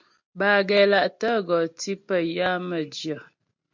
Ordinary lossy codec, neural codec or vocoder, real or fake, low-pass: MP3, 48 kbps; none; real; 7.2 kHz